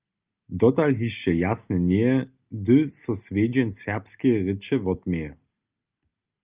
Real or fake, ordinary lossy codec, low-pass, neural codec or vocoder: real; Opus, 24 kbps; 3.6 kHz; none